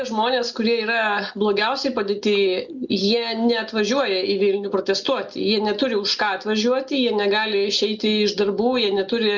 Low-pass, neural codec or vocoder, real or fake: 7.2 kHz; none; real